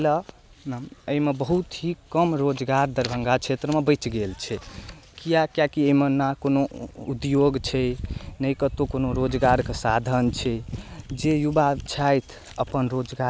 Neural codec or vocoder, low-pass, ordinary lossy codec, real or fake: none; none; none; real